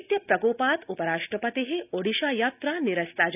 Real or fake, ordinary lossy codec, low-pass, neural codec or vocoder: real; none; 3.6 kHz; none